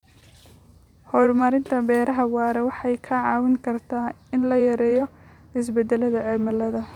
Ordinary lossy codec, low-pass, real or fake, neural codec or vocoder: none; 19.8 kHz; fake; vocoder, 44.1 kHz, 128 mel bands every 512 samples, BigVGAN v2